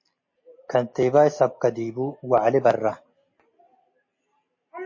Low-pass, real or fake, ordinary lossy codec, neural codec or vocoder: 7.2 kHz; real; MP3, 32 kbps; none